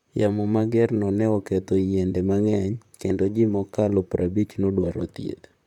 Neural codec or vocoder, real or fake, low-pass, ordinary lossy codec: vocoder, 44.1 kHz, 128 mel bands, Pupu-Vocoder; fake; 19.8 kHz; none